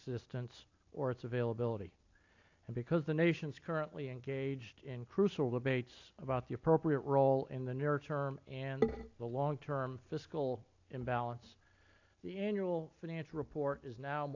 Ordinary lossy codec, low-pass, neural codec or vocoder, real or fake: AAC, 48 kbps; 7.2 kHz; none; real